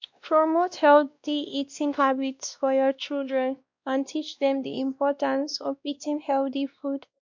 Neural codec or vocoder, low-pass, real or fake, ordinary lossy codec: codec, 16 kHz, 1 kbps, X-Codec, WavLM features, trained on Multilingual LibriSpeech; 7.2 kHz; fake; MP3, 64 kbps